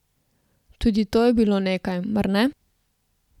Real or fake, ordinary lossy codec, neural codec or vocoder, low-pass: real; none; none; 19.8 kHz